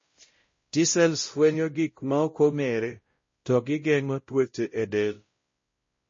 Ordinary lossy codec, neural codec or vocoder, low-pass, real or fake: MP3, 32 kbps; codec, 16 kHz, 0.5 kbps, X-Codec, WavLM features, trained on Multilingual LibriSpeech; 7.2 kHz; fake